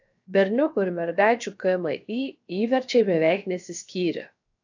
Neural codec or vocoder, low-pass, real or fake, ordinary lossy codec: codec, 16 kHz, 0.7 kbps, FocalCodec; 7.2 kHz; fake; AAC, 48 kbps